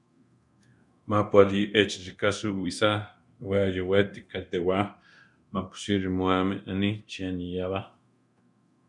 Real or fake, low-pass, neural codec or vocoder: fake; 10.8 kHz; codec, 24 kHz, 0.9 kbps, DualCodec